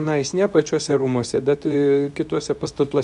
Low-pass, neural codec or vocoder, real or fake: 10.8 kHz; codec, 24 kHz, 0.9 kbps, WavTokenizer, medium speech release version 2; fake